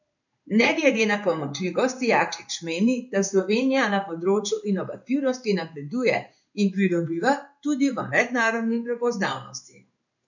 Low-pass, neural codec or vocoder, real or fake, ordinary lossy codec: 7.2 kHz; codec, 16 kHz in and 24 kHz out, 1 kbps, XY-Tokenizer; fake; none